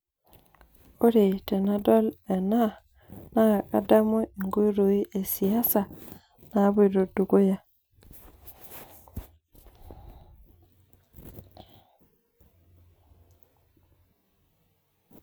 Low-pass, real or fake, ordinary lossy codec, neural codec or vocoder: none; real; none; none